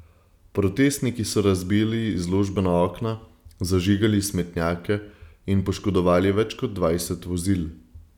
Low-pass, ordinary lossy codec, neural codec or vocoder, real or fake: 19.8 kHz; none; none; real